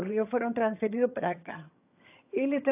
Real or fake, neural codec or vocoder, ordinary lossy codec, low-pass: fake; vocoder, 22.05 kHz, 80 mel bands, HiFi-GAN; AAC, 32 kbps; 3.6 kHz